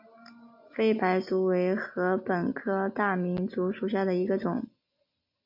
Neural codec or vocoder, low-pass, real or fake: none; 5.4 kHz; real